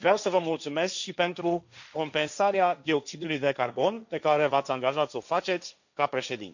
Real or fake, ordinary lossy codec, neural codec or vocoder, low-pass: fake; none; codec, 16 kHz, 1.1 kbps, Voila-Tokenizer; 7.2 kHz